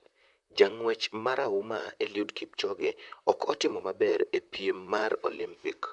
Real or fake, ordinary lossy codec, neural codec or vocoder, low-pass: fake; none; vocoder, 44.1 kHz, 128 mel bands, Pupu-Vocoder; 10.8 kHz